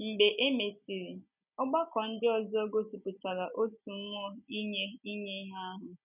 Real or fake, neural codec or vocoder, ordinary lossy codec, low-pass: real; none; none; 3.6 kHz